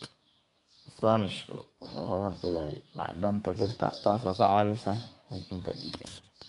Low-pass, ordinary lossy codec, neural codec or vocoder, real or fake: 10.8 kHz; none; codec, 24 kHz, 1 kbps, SNAC; fake